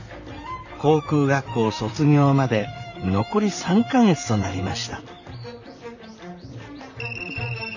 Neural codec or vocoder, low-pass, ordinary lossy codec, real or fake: vocoder, 44.1 kHz, 128 mel bands, Pupu-Vocoder; 7.2 kHz; none; fake